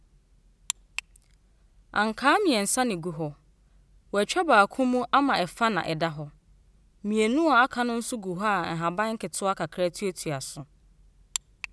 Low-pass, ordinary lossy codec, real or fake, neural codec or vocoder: none; none; real; none